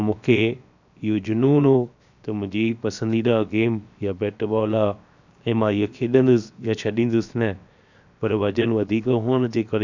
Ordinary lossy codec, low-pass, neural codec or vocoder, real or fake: none; 7.2 kHz; codec, 16 kHz, 0.7 kbps, FocalCodec; fake